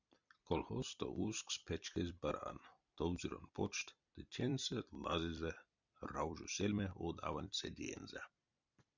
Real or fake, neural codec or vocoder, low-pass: fake; vocoder, 44.1 kHz, 128 mel bands every 256 samples, BigVGAN v2; 7.2 kHz